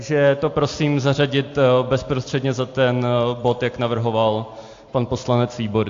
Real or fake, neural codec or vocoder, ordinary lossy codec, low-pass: real; none; AAC, 48 kbps; 7.2 kHz